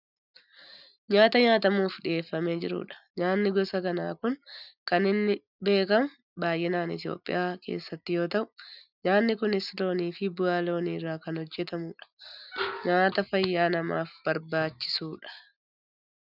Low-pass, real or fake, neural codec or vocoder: 5.4 kHz; real; none